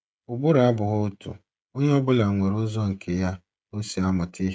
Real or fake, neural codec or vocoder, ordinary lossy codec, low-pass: fake; codec, 16 kHz, 16 kbps, FreqCodec, smaller model; none; none